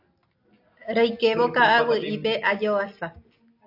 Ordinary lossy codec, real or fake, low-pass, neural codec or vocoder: AAC, 32 kbps; real; 5.4 kHz; none